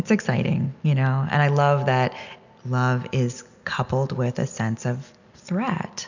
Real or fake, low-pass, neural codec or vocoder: real; 7.2 kHz; none